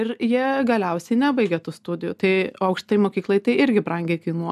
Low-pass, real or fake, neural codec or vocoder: 14.4 kHz; real; none